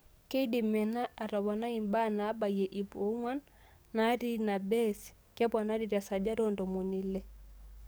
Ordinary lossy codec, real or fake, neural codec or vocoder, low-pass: none; fake; codec, 44.1 kHz, 7.8 kbps, DAC; none